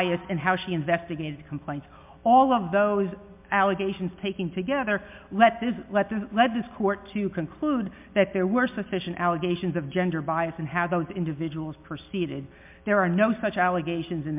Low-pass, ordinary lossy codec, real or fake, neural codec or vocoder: 3.6 kHz; MP3, 32 kbps; real; none